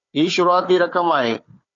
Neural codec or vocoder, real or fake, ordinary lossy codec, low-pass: codec, 16 kHz, 4 kbps, FunCodec, trained on Chinese and English, 50 frames a second; fake; AAC, 48 kbps; 7.2 kHz